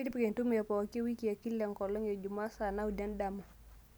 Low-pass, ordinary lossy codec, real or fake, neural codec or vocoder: none; none; real; none